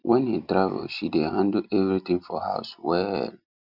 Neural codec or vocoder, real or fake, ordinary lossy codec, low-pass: none; real; none; 5.4 kHz